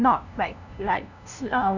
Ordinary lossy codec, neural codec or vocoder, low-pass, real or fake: none; codec, 16 kHz, 0.5 kbps, FunCodec, trained on LibriTTS, 25 frames a second; 7.2 kHz; fake